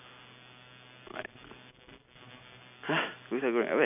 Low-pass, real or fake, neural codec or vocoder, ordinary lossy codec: 3.6 kHz; real; none; none